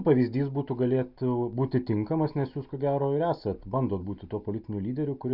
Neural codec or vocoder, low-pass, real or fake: none; 5.4 kHz; real